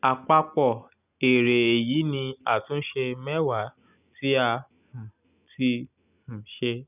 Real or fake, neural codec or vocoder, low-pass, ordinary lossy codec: real; none; 3.6 kHz; none